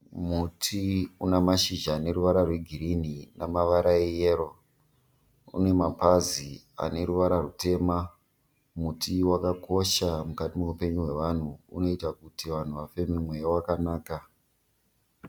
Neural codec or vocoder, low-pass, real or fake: none; 19.8 kHz; real